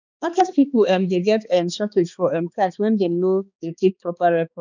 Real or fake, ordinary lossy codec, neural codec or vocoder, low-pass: fake; none; codec, 16 kHz, 2 kbps, X-Codec, HuBERT features, trained on balanced general audio; 7.2 kHz